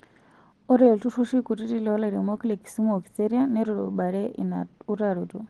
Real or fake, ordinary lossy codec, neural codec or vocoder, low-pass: real; Opus, 16 kbps; none; 10.8 kHz